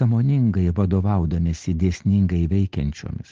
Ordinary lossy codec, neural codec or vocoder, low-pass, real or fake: Opus, 16 kbps; none; 7.2 kHz; real